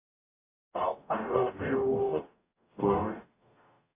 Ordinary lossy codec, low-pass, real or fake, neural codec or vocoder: AAC, 24 kbps; 3.6 kHz; fake; codec, 44.1 kHz, 0.9 kbps, DAC